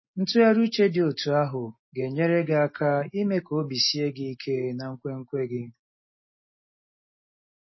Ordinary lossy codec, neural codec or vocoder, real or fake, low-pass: MP3, 24 kbps; none; real; 7.2 kHz